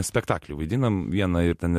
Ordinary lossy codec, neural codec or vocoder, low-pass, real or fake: MP3, 64 kbps; none; 14.4 kHz; real